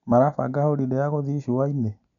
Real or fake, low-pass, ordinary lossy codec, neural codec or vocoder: real; 7.2 kHz; none; none